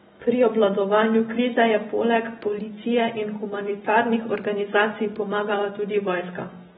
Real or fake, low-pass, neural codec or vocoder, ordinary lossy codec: real; 19.8 kHz; none; AAC, 16 kbps